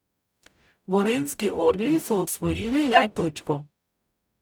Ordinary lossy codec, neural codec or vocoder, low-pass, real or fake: none; codec, 44.1 kHz, 0.9 kbps, DAC; none; fake